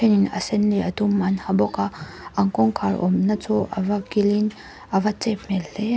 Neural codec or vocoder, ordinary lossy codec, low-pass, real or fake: none; none; none; real